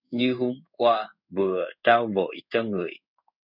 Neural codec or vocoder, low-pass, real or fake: codec, 16 kHz in and 24 kHz out, 1 kbps, XY-Tokenizer; 5.4 kHz; fake